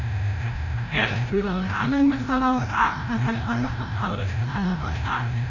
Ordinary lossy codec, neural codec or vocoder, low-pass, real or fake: none; codec, 16 kHz, 0.5 kbps, FreqCodec, larger model; 7.2 kHz; fake